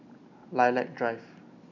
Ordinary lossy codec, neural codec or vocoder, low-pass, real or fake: none; none; 7.2 kHz; real